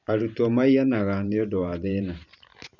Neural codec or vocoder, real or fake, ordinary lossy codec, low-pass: vocoder, 44.1 kHz, 128 mel bands every 512 samples, BigVGAN v2; fake; none; 7.2 kHz